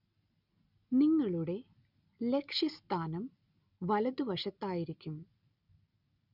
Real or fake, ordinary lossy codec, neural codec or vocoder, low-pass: real; none; none; 5.4 kHz